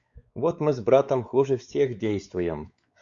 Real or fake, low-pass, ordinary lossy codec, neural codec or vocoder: fake; 7.2 kHz; Opus, 64 kbps; codec, 16 kHz, 4 kbps, X-Codec, WavLM features, trained on Multilingual LibriSpeech